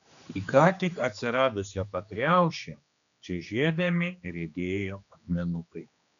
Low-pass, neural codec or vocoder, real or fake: 7.2 kHz; codec, 16 kHz, 2 kbps, X-Codec, HuBERT features, trained on general audio; fake